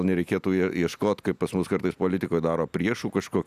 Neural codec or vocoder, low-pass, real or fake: none; 14.4 kHz; real